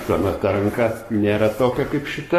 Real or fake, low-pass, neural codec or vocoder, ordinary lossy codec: fake; 14.4 kHz; codec, 44.1 kHz, 7.8 kbps, Pupu-Codec; AAC, 48 kbps